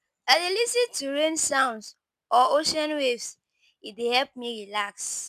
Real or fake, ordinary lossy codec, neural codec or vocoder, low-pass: real; none; none; 14.4 kHz